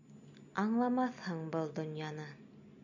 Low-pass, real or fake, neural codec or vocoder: 7.2 kHz; real; none